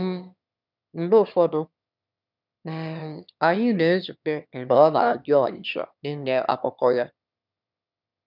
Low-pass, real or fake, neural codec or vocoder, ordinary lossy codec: 5.4 kHz; fake; autoencoder, 22.05 kHz, a latent of 192 numbers a frame, VITS, trained on one speaker; none